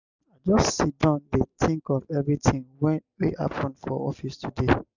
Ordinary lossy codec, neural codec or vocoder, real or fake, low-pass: none; none; real; 7.2 kHz